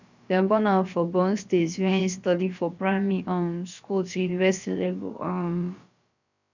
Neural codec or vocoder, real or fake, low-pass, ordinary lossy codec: codec, 16 kHz, about 1 kbps, DyCAST, with the encoder's durations; fake; 7.2 kHz; none